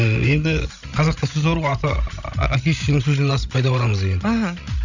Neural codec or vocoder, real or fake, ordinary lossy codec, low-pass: codec, 16 kHz, 16 kbps, FreqCodec, larger model; fake; none; 7.2 kHz